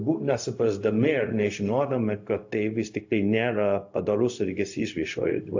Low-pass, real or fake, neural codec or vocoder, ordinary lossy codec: 7.2 kHz; fake; codec, 16 kHz, 0.4 kbps, LongCat-Audio-Codec; AAC, 48 kbps